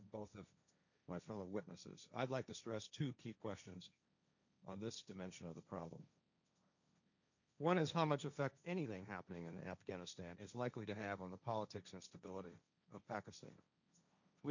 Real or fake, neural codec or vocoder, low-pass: fake; codec, 16 kHz, 1.1 kbps, Voila-Tokenizer; 7.2 kHz